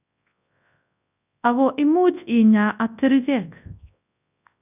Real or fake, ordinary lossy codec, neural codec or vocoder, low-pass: fake; none; codec, 24 kHz, 0.9 kbps, WavTokenizer, large speech release; 3.6 kHz